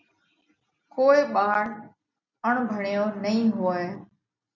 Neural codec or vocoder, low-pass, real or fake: none; 7.2 kHz; real